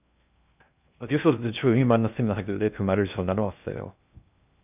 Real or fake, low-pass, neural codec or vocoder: fake; 3.6 kHz; codec, 16 kHz in and 24 kHz out, 0.6 kbps, FocalCodec, streaming, 2048 codes